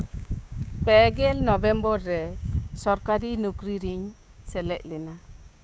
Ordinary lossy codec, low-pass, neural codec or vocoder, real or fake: none; none; codec, 16 kHz, 6 kbps, DAC; fake